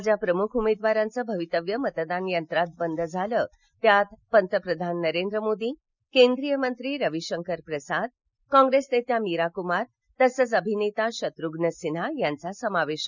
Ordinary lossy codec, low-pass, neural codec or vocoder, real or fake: none; 7.2 kHz; none; real